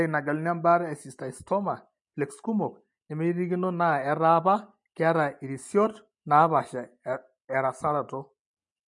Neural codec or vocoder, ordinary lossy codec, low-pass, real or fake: none; MP3, 48 kbps; 10.8 kHz; real